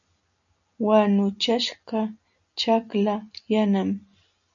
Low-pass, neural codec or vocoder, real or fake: 7.2 kHz; none; real